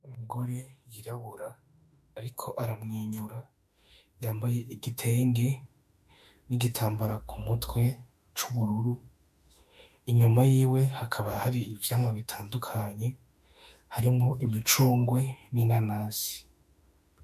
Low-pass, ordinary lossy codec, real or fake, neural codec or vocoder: 14.4 kHz; MP3, 96 kbps; fake; autoencoder, 48 kHz, 32 numbers a frame, DAC-VAE, trained on Japanese speech